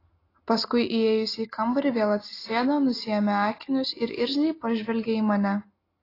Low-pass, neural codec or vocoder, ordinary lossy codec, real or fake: 5.4 kHz; none; AAC, 24 kbps; real